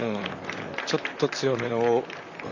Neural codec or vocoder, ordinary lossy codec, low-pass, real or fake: vocoder, 44.1 kHz, 128 mel bands, Pupu-Vocoder; none; 7.2 kHz; fake